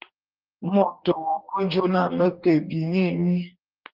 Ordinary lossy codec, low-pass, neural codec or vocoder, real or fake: Opus, 24 kbps; 5.4 kHz; codec, 44.1 kHz, 2.6 kbps, DAC; fake